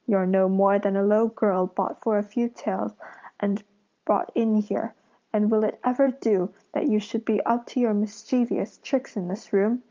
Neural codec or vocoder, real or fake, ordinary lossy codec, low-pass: vocoder, 44.1 kHz, 128 mel bands every 512 samples, BigVGAN v2; fake; Opus, 24 kbps; 7.2 kHz